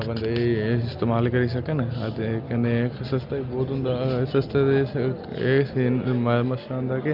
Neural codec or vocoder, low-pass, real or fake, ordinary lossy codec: none; 5.4 kHz; real; Opus, 24 kbps